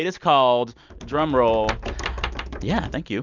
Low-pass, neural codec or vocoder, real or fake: 7.2 kHz; none; real